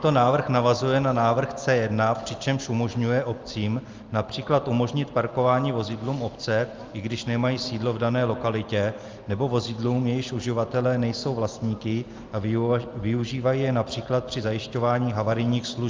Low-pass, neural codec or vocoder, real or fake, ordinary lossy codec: 7.2 kHz; none; real; Opus, 32 kbps